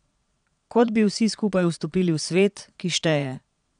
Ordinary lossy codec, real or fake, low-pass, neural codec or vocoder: none; fake; 9.9 kHz; vocoder, 22.05 kHz, 80 mel bands, Vocos